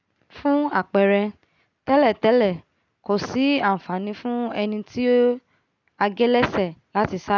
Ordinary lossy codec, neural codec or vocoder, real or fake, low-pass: none; none; real; 7.2 kHz